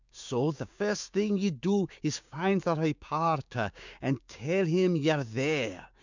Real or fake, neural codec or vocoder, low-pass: fake; codec, 16 kHz, 6 kbps, DAC; 7.2 kHz